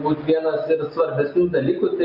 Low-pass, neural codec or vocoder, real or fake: 5.4 kHz; none; real